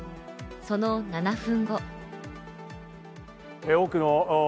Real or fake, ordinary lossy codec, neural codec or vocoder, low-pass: real; none; none; none